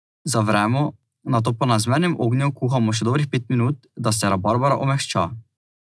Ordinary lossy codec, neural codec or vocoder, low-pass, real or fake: none; none; none; real